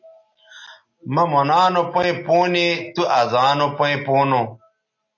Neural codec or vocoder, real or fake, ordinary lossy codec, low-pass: none; real; AAC, 48 kbps; 7.2 kHz